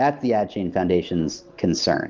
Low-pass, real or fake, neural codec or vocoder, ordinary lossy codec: 7.2 kHz; real; none; Opus, 32 kbps